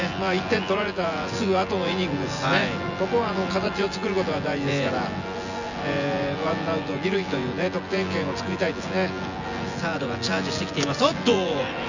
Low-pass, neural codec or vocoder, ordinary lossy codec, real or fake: 7.2 kHz; vocoder, 24 kHz, 100 mel bands, Vocos; none; fake